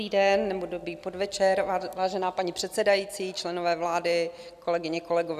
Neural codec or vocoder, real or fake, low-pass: none; real; 14.4 kHz